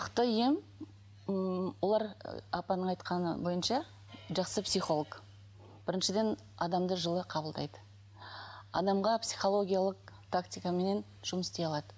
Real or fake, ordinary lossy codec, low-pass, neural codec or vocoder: real; none; none; none